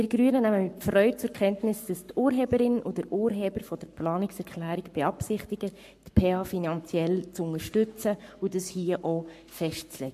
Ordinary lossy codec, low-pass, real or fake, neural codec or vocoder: MP3, 64 kbps; 14.4 kHz; fake; codec, 44.1 kHz, 7.8 kbps, Pupu-Codec